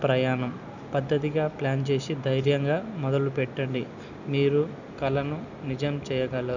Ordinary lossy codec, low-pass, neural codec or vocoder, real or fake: none; 7.2 kHz; none; real